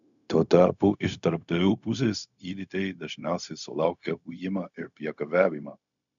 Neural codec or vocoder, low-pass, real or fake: codec, 16 kHz, 0.4 kbps, LongCat-Audio-Codec; 7.2 kHz; fake